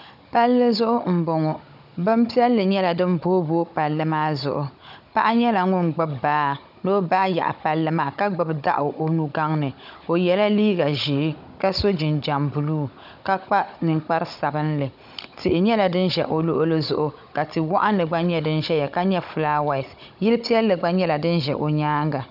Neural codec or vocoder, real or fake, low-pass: codec, 16 kHz, 16 kbps, FunCodec, trained on Chinese and English, 50 frames a second; fake; 5.4 kHz